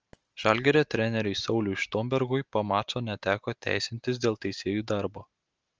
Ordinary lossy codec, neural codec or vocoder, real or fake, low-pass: Opus, 24 kbps; none; real; 7.2 kHz